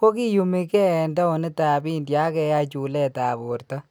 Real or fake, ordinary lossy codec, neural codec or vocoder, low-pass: real; none; none; none